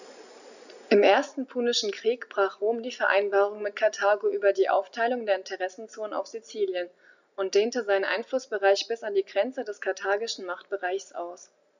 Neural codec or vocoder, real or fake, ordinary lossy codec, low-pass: none; real; none; 7.2 kHz